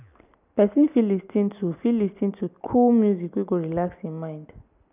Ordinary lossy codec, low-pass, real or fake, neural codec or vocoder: none; 3.6 kHz; real; none